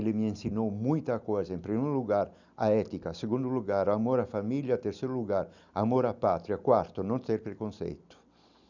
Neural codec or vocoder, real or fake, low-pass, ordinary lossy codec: none; real; 7.2 kHz; none